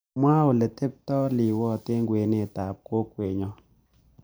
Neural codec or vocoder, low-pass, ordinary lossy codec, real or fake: vocoder, 44.1 kHz, 128 mel bands every 512 samples, BigVGAN v2; none; none; fake